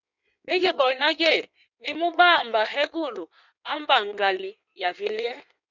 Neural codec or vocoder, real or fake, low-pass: codec, 16 kHz in and 24 kHz out, 1.1 kbps, FireRedTTS-2 codec; fake; 7.2 kHz